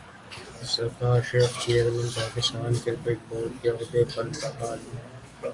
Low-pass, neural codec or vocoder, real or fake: 10.8 kHz; codec, 44.1 kHz, 7.8 kbps, DAC; fake